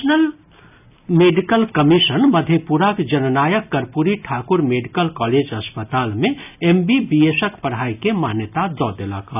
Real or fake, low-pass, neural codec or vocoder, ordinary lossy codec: real; 3.6 kHz; none; none